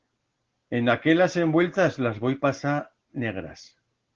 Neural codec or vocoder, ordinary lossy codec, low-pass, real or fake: none; Opus, 16 kbps; 7.2 kHz; real